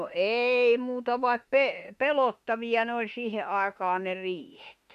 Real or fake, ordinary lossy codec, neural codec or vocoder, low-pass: fake; MP3, 64 kbps; autoencoder, 48 kHz, 32 numbers a frame, DAC-VAE, trained on Japanese speech; 14.4 kHz